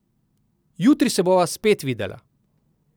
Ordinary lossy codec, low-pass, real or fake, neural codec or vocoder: none; none; real; none